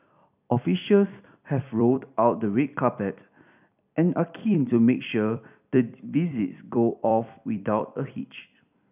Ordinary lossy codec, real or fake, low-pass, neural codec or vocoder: none; fake; 3.6 kHz; vocoder, 44.1 kHz, 128 mel bands every 256 samples, BigVGAN v2